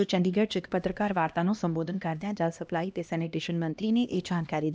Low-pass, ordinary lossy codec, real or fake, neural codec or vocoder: none; none; fake; codec, 16 kHz, 1 kbps, X-Codec, HuBERT features, trained on LibriSpeech